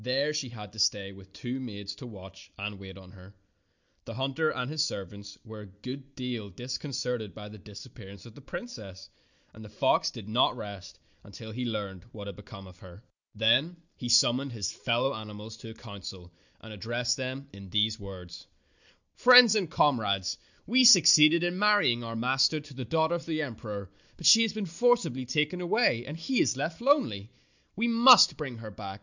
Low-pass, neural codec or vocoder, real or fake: 7.2 kHz; none; real